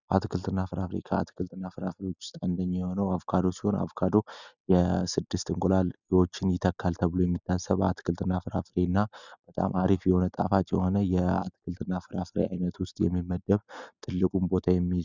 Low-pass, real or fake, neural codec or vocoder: 7.2 kHz; real; none